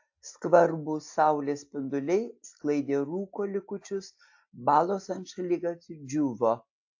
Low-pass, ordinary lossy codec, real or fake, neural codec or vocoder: 7.2 kHz; AAC, 48 kbps; real; none